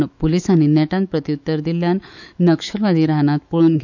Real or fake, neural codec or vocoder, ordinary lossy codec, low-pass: fake; autoencoder, 48 kHz, 128 numbers a frame, DAC-VAE, trained on Japanese speech; none; 7.2 kHz